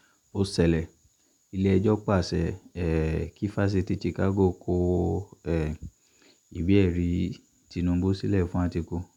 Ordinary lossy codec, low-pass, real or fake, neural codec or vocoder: none; 19.8 kHz; real; none